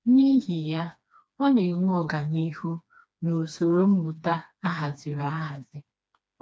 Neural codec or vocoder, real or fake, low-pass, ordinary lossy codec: codec, 16 kHz, 2 kbps, FreqCodec, smaller model; fake; none; none